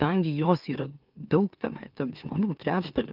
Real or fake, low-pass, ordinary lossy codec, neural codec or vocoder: fake; 5.4 kHz; Opus, 24 kbps; autoencoder, 44.1 kHz, a latent of 192 numbers a frame, MeloTTS